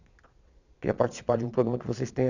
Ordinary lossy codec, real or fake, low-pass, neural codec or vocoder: none; fake; 7.2 kHz; vocoder, 22.05 kHz, 80 mel bands, Vocos